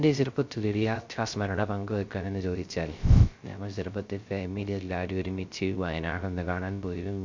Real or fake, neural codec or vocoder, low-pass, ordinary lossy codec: fake; codec, 16 kHz, 0.3 kbps, FocalCodec; 7.2 kHz; MP3, 64 kbps